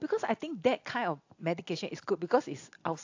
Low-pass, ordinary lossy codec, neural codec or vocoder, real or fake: 7.2 kHz; AAC, 48 kbps; none; real